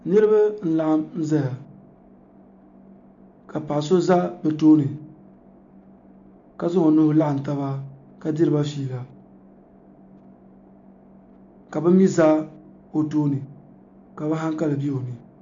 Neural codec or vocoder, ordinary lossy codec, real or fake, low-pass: none; MP3, 64 kbps; real; 7.2 kHz